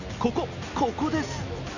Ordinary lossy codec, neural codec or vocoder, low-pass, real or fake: none; none; 7.2 kHz; real